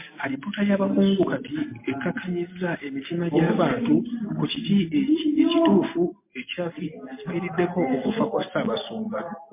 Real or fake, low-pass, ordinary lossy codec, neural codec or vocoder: real; 3.6 kHz; MP3, 24 kbps; none